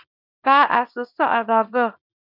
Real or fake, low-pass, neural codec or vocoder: fake; 5.4 kHz; codec, 24 kHz, 0.9 kbps, WavTokenizer, small release